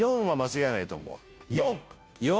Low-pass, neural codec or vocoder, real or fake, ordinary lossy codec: none; codec, 16 kHz, 0.5 kbps, FunCodec, trained on Chinese and English, 25 frames a second; fake; none